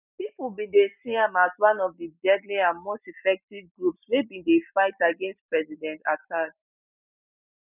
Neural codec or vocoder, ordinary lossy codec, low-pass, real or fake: none; none; 3.6 kHz; real